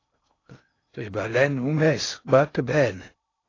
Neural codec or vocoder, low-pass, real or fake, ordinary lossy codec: codec, 16 kHz in and 24 kHz out, 0.6 kbps, FocalCodec, streaming, 2048 codes; 7.2 kHz; fake; AAC, 32 kbps